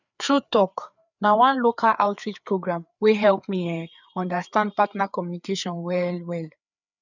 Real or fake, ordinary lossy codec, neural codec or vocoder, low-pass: fake; none; codec, 16 kHz, 4 kbps, FreqCodec, larger model; 7.2 kHz